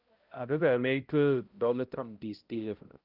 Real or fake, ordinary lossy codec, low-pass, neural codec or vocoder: fake; Opus, 32 kbps; 5.4 kHz; codec, 16 kHz, 0.5 kbps, X-Codec, HuBERT features, trained on balanced general audio